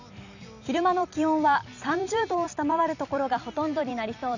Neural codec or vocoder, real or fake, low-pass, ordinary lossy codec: vocoder, 44.1 kHz, 128 mel bands every 256 samples, BigVGAN v2; fake; 7.2 kHz; none